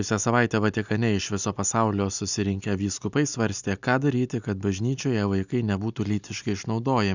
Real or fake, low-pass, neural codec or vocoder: real; 7.2 kHz; none